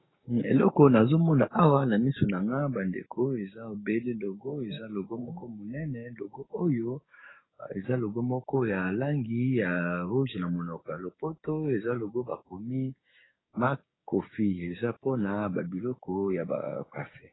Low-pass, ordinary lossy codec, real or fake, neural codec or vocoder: 7.2 kHz; AAC, 16 kbps; fake; codec, 16 kHz, 6 kbps, DAC